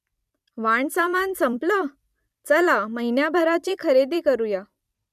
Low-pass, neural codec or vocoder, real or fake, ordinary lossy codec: 14.4 kHz; vocoder, 44.1 kHz, 128 mel bands every 256 samples, BigVGAN v2; fake; none